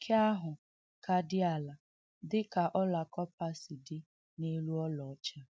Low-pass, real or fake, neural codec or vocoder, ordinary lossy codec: none; real; none; none